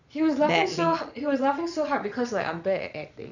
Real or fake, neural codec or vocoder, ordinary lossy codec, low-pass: fake; vocoder, 22.05 kHz, 80 mel bands, Vocos; none; 7.2 kHz